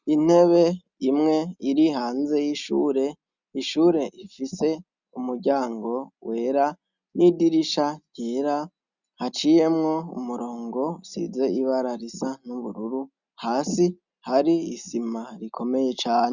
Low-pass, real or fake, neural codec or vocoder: 7.2 kHz; real; none